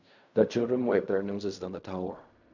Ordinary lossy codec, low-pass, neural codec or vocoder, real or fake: none; 7.2 kHz; codec, 16 kHz in and 24 kHz out, 0.4 kbps, LongCat-Audio-Codec, fine tuned four codebook decoder; fake